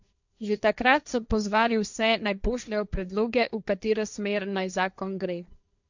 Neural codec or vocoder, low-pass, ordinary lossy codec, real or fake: codec, 16 kHz, 1.1 kbps, Voila-Tokenizer; 7.2 kHz; none; fake